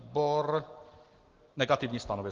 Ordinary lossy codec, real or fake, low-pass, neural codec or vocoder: Opus, 16 kbps; real; 7.2 kHz; none